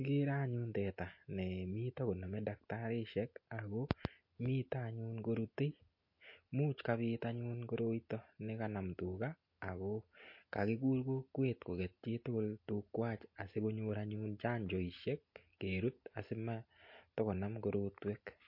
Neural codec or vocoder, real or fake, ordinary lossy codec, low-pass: none; real; MP3, 32 kbps; 5.4 kHz